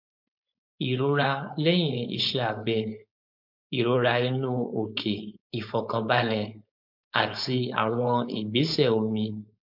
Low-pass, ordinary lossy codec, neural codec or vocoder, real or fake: 5.4 kHz; MP3, 48 kbps; codec, 16 kHz, 4.8 kbps, FACodec; fake